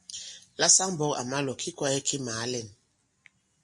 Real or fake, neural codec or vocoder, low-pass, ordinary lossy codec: real; none; 10.8 kHz; MP3, 48 kbps